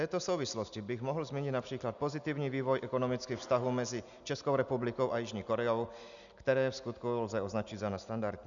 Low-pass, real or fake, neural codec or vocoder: 7.2 kHz; real; none